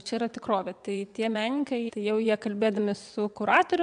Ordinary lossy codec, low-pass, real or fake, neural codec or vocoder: AAC, 96 kbps; 9.9 kHz; fake; vocoder, 22.05 kHz, 80 mel bands, WaveNeXt